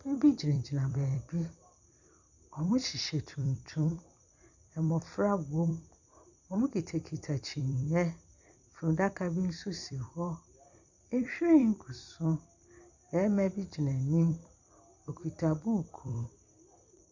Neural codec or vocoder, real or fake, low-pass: vocoder, 44.1 kHz, 128 mel bands every 256 samples, BigVGAN v2; fake; 7.2 kHz